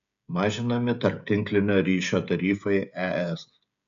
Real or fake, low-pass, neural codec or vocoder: fake; 7.2 kHz; codec, 16 kHz, 16 kbps, FreqCodec, smaller model